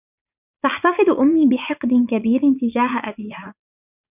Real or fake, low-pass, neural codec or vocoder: fake; 3.6 kHz; vocoder, 44.1 kHz, 80 mel bands, Vocos